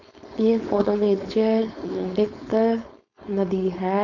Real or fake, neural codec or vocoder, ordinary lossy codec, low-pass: fake; codec, 16 kHz, 4.8 kbps, FACodec; none; 7.2 kHz